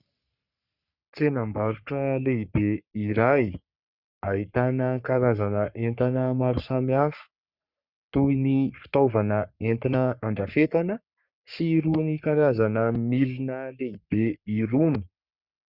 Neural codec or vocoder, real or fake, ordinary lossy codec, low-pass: codec, 44.1 kHz, 3.4 kbps, Pupu-Codec; fake; Opus, 64 kbps; 5.4 kHz